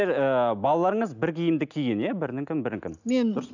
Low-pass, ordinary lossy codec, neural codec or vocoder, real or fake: 7.2 kHz; none; none; real